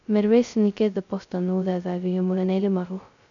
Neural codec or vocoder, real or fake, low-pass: codec, 16 kHz, 0.2 kbps, FocalCodec; fake; 7.2 kHz